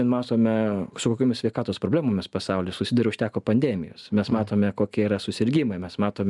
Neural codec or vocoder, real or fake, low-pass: none; real; 10.8 kHz